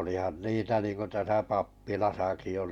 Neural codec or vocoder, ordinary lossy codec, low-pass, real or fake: vocoder, 44.1 kHz, 128 mel bands every 512 samples, BigVGAN v2; none; 19.8 kHz; fake